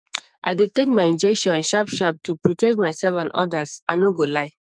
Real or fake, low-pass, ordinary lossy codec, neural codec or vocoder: fake; 9.9 kHz; none; codec, 44.1 kHz, 2.6 kbps, SNAC